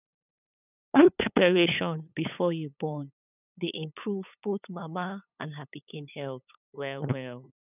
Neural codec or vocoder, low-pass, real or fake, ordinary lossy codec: codec, 16 kHz, 8 kbps, FunCodec, trained on LibriTTS, 25 frames a second; 3.6 kHz; fake; none